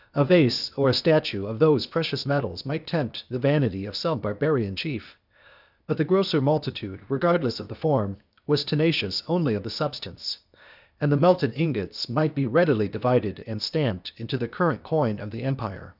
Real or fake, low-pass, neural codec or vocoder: fake; 5.4 kHz; codec, 16 kHz, 0.8 kbps, ZipCodec